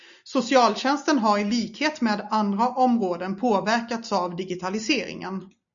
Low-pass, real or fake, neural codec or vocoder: 7.2 kHz; real; none